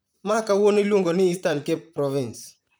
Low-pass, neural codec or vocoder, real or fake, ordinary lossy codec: none; vocoder, 44.1 kHz, 128 mel bands, Pupu-Vocoder; fake; none